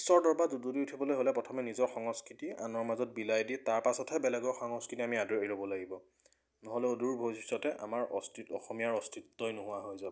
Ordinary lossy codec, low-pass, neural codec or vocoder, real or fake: none; none; none; real